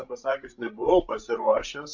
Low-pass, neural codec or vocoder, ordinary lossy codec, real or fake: 7.2 kHz; codec, 16 kHz, 16 kbps, FreqCodec, larger model; MP3, 64 kbps; fake